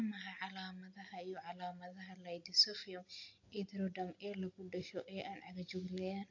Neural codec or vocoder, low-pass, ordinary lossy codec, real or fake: none; 7.2 kHz; AAC, 48 kbps; real